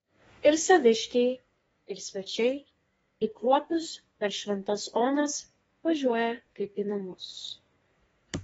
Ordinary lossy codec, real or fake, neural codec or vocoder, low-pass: AAC, 24 kbps; fake; codec, 32 kHz, 1.9 kbps, SNAC; 14.4 kHz